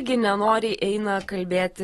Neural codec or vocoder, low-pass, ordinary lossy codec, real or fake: none; 19.8 kHz; AAC, 32 kbps; real